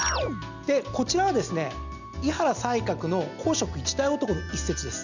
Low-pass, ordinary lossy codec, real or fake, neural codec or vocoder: 7.2 kHz; none; real; none